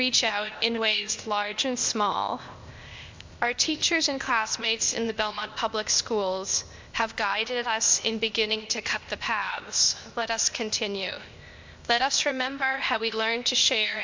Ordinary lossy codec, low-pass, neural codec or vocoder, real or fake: MP3, 64 kbps; 7.2 kHz; codec, 16 kHz, 0.8 kbps, ZipCodec; fake